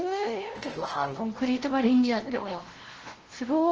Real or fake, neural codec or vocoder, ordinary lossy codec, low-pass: fake; codec, 16 kHz, 0.5 kbps, FunCodec, trained on LibriTTS, 25 frames a second; Opus, 16 kbps; 7.2 kHz